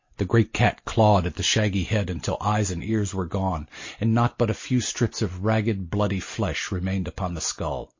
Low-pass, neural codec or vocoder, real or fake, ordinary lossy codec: 7.2 kHz; none; real; MP3, 32 kbps